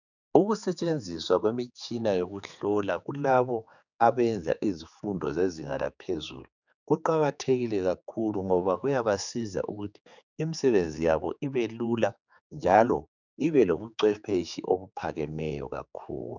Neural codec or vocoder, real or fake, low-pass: codec, 16 kHz, 4 kbps, X-Codec, HuBERT features, trained on general audio; fake; 7.2 kHz